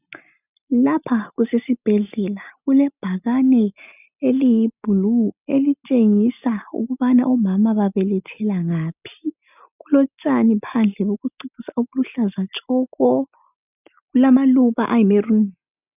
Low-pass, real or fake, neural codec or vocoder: 3.6 kHz; real; none